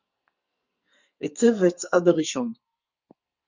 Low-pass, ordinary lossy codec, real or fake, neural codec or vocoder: 7.2 kHz; Opus, 64 kbps; fake; codec, 44.1 kHz, 2.6 kbps, SNAC